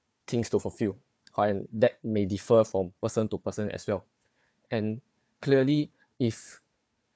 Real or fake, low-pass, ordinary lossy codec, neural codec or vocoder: fake; none; none; codec, 16 kHz, 4 kbps, FunCodec, trained on Chinese and English, 50 frames a second